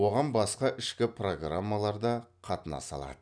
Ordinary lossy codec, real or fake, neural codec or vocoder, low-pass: none; real; none; 9.9 kHz